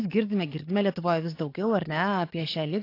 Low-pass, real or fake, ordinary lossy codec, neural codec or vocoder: 5.4 kHz; fake; AAC, 32 kbps; codec, 44.1 kHz, 7.8 kbps, DAC